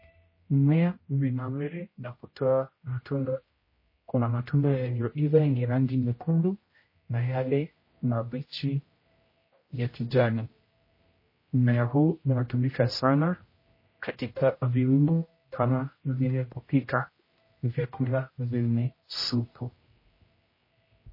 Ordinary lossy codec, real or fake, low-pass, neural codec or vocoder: MP3, 24 kbps; fake; 5.4 kHz; codec, 16 kHz, 0.5 kbps, X-Codec, HuBERT features, trained on general audio